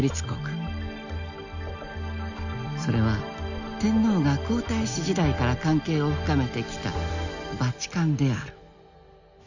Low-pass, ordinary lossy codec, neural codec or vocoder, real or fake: 7.2 kHz; Opus, 64 kbps; none; real